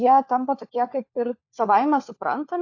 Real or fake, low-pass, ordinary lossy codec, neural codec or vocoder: fake; 7.2 kHz; AAC, 48 kbps; codec, 16 kHz, 4 kbps, FunCodec, trained on LibriTTS, 50 frames a second